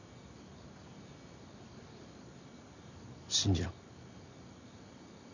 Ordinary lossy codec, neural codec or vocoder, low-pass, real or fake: none; none; 7.2 kHz; real